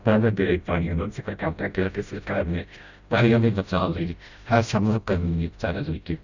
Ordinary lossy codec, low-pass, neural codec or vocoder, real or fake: Opus, 64 kbps; 7.2 kHz; codec, 16 kHz, 0.5 kbps, FreqCodec, smaller model; fake